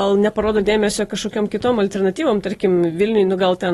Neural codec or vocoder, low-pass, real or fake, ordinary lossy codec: none; 19.8 kHz; real; AAC, 32 kbps